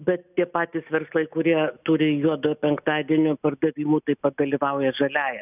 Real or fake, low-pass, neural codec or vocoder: real; 3.6 kHz; none